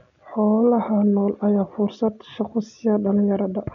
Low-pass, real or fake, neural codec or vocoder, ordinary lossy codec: 7.2 kHz; real; none; none